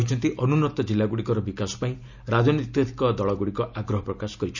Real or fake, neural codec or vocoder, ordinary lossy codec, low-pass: real; none; none; 7.2 kHz